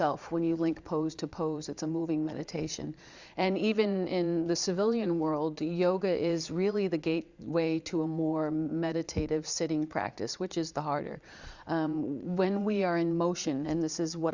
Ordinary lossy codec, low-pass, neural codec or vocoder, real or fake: Opus, 64 kbps; 7.2 kHz; vocoder, 22.05 kHz, 80 mel bands, Vocos; fake